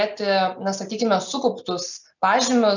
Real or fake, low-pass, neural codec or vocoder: real; 7.2 kHz; none